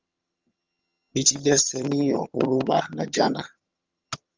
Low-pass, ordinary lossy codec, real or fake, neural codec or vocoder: 7.2 kHz; Opus, 32 kbps; fake; vocoder, 22.05 kHz, 80 mel bands, HiFi-GAN